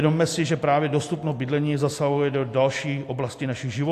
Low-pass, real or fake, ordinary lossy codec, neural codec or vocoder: 14.4 kHz; real; AAC, 64 kbps; none